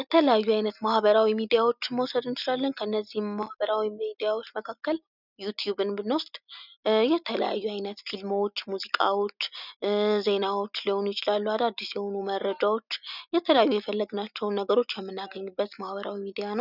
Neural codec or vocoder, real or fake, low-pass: none; real; 5.4 kHz